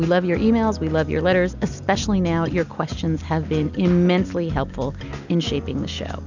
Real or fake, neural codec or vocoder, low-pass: real; none; 7.2 kHz